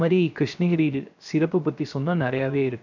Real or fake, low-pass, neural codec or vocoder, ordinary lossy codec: fake; 7.2 kHz; codec, 16 kHz, 0.3 kbps, FocalCodec; none